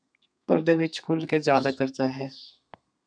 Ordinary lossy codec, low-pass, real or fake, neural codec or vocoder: MP3, 96 kbps; 9.9 kHz; fake; codec, 32 kHz, 1.9 kbps, SNAC